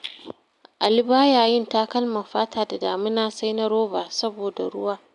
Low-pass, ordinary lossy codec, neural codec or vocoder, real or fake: 10.8 kHz; none; none; real